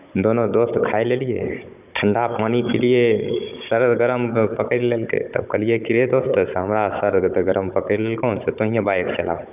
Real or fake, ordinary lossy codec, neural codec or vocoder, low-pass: fake; none; codec, 16 kHz, 16 kbps, FunCodec, trained on Chinese and English, 50 frames a second; 3.6 kHz